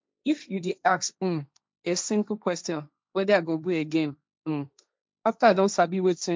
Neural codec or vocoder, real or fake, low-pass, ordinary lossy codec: codec, 16 kHz, 1.1 kbps, Voila-Tokenizer; fake; none; none